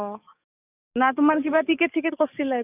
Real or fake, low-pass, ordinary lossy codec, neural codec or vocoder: fake; 3.6 kHz; none; codec, 44.1 kHz, 7.8 kbps, DAC